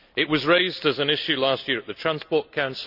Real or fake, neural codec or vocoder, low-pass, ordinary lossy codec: real; none; 5.4 kHz; none